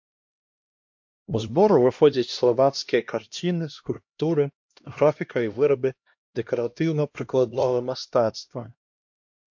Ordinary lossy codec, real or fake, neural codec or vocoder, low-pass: MP3, 48 kbps; fake; codec, 16 kHz, 1 kbps, X-Codec, HuBERT features, trained on LibriSpeech; 7.2 kHz